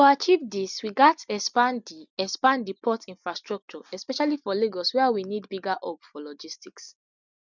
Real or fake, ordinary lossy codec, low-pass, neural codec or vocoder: real; none; 7.2 kHz; none